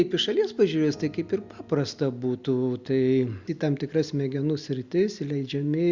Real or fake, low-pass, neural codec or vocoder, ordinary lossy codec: real; 7.2 kHz; none; Opus, 64 kbps